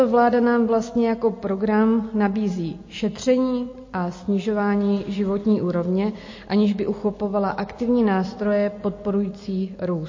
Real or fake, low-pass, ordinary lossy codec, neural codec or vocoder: real; 7.2 kHz; MP3, 32 kbps; none